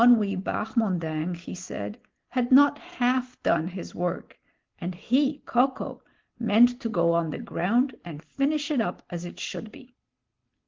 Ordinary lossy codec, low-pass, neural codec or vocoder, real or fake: Opus, 16 kbps; 7.2 kHz; none; real